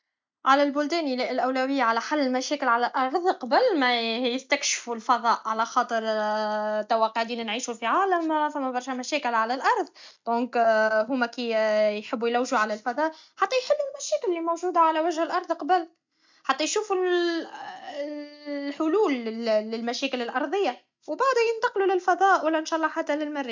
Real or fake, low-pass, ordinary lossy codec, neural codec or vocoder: real; 7.2 kHz; MP3, 64 kbps; none